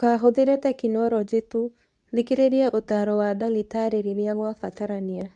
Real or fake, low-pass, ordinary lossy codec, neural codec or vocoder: fake; 10.8 kHz; none; codec, 24 kHz, 0.9 kbps, WavTokenizer, medium speech release version 2